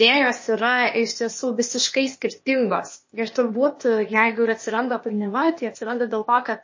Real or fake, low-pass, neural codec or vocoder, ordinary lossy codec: fake; 7.2 kHz; codec, 16 kHz, 0.8 kbps, ZipCodec; MP3, 32 kbps